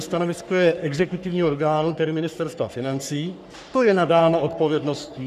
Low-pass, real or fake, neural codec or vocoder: 14.4 kHz; fake; codec, 44.1 kHz, 3.4 kbps, Pupu-Codec